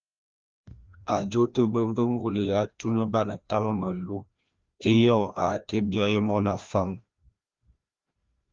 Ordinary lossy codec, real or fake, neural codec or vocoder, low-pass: Opus, 32 kbps; fake; codec, 16 kHz, 1 kbps, FreqCodec, larger model; 7.2 kHz